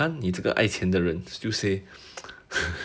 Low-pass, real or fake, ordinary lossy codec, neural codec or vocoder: none; real; none; none